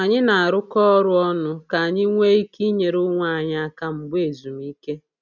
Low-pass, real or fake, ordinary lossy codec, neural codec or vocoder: 7.2 kHz; real; none; none